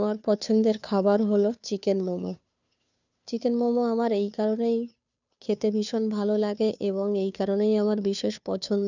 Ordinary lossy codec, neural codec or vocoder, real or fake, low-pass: none; codec, 16 kHz, 2 kbps, FunCodec, trained on Chinese and English, 25 frames a second; fake; 7.2 kHz